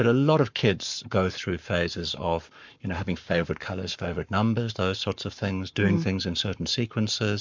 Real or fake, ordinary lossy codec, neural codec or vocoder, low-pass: fake; MP3, 64 kbps; codec, 44.1 kHz, 7.8 kbps, Pupu-Codec; 7.2 kHz